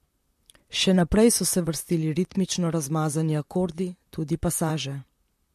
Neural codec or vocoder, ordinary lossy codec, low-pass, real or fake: vocoder, 44.1 kHz, 128 mel bands, Pupu-Vocoder; MP3, 64 kbps; 14.4 kHz; fake